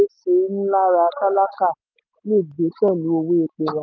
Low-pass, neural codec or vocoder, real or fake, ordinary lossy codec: 7.2 kHz; none; real; none